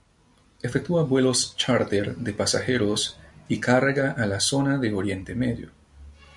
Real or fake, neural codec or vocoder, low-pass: real; none; 10.8 kHz